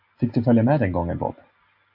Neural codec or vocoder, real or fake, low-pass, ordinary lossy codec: none; real; 5.4 kHz; AAC, 48 kbps